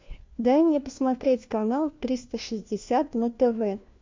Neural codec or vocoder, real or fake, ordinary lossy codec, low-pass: codec, 16 kHz, 1 kbps, FunCodec, trained on LibriTTS, 50 frames a second; fake; MP3, 48 kbps; 7.2 kHz